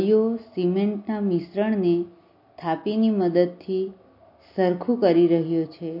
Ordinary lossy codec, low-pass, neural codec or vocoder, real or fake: MP3, 32 kbps; 5.4 kHz; none; real